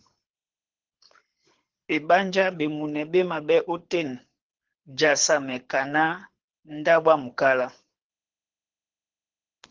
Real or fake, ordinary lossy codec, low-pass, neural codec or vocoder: fake; Opus, 16 kbps; 7.2 kHz; codec, 24 kHz, 6 kbps, HILCodec